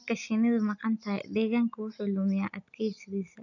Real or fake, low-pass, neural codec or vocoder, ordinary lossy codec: real; 7.2 kHz; none; none